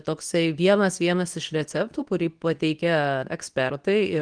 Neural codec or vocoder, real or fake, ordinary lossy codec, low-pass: codec, 24 kHz, 0.9 kbps, WavTokenizer, medium speech release version 2; fake; Opus, 24 kbps; 9.9 kHz